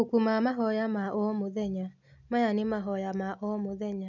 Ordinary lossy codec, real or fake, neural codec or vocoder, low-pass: none; real; none; 7.2 kHz